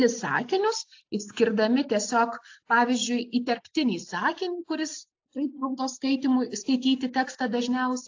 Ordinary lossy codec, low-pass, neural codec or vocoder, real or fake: AAC, 48 kbps; 7.2 kHz; none; real